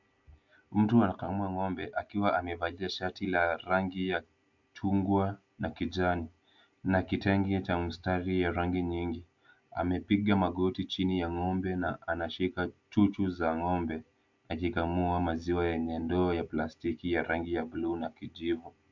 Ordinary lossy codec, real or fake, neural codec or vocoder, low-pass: MP3, 64 kbps; real; none; 7.2 kHz